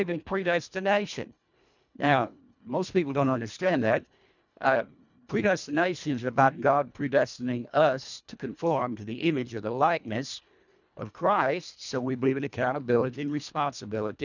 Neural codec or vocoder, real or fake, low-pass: codec, 24 kHz, 1.5 kbps, HILCodec; fake; 7.2 kHz